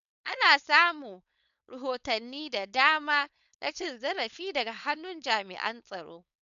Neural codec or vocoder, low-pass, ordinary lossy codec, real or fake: codec, 16 kHz, 4.8 kbps, FACodec; 7.2 kHz; none; fake